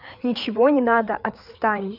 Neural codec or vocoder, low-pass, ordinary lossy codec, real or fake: codec, 16 kHz, 4 kbps, FreqCodec, larger model; 5.4 kHz; none; fake